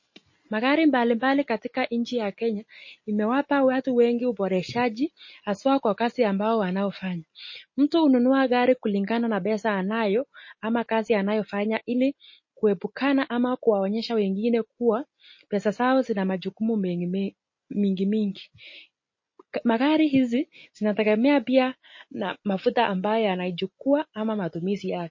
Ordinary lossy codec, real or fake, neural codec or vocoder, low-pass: MP3, 32 kbps; real; none; 7.2 kHz